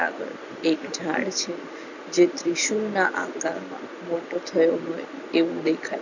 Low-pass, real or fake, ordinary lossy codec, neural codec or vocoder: 7.2 kHz; real; none; none